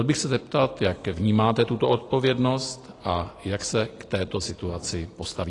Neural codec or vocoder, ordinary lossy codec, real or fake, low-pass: none; AAC, 32 kbps; real; 10.8 kHz